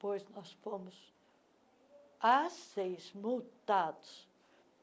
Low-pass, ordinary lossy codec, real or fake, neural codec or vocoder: none; none; real; none